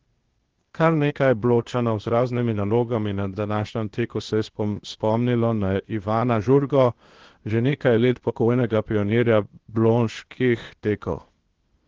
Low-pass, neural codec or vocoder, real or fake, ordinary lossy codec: 7.2 kHz; codec, 16 kHz, 0.8 kbps, ZipCodec; fake; Opus, 16 kbps